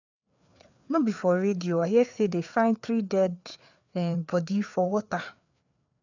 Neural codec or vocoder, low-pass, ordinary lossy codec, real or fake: codec, 16 kHz, 4 kbps, FreqCodec, larger model; 7.2 kHz; none; fake